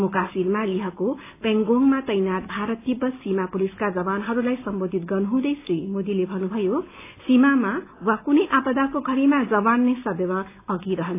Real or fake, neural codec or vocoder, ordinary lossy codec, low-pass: real; none; AAC, 24 kbps; 3.6 kHz